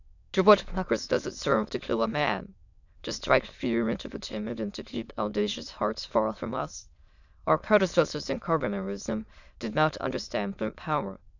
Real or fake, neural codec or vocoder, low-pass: fake; autoencoder, 22.05 kHz, a latent of 192 numbers a frame, VITS, trained on many speakers; 7.2 kHz